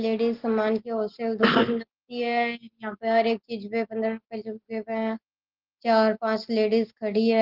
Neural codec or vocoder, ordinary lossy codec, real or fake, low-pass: none; Opus, 16 kbps; real; 5.4 kHz